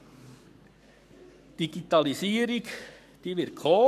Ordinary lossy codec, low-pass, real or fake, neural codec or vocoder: none; 14.4 kHz; fake; codec, 44.1 kHz, 7.8 kbps, Pupu-Codec